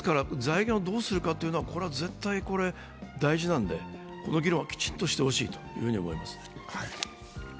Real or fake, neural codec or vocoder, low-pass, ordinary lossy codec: real; none; none; none